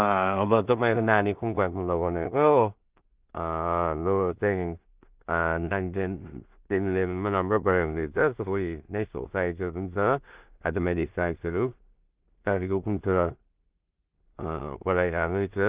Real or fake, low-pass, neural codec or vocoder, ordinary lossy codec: fake; 3.6 kHz; codec, 16 kHz in and 24 kHz out, 0.4 kbps, LongCat-Audio-Codec, two codebook decoder; Opus, 32 kbps